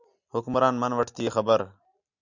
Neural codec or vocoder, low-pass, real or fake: none; 7.2 kHz; real